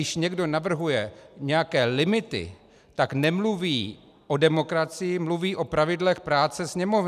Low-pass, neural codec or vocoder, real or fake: 14.4 kHz; none; real